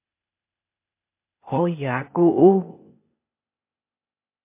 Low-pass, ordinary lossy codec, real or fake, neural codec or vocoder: 3.6 kHz; MP3, 24 kbps; fake; codec, 16 kHz, 0.8 kbps, ZipCodec